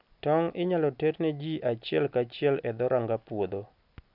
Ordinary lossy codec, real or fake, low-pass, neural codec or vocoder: none; real; 5.4 kHz; none